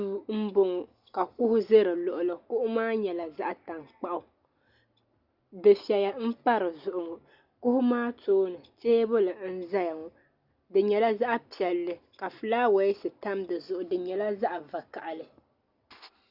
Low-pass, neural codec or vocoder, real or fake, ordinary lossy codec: 5.4 kHz; none; real; Opus, 64 kbps